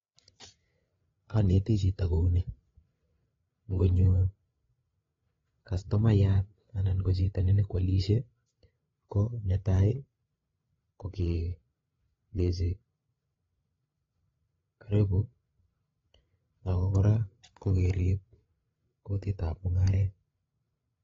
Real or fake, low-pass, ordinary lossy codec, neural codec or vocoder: fake; 7.2 kHz; AAC, 24 kbps; codec, 16 kHz, 8 kbps, FreqCodec, larger model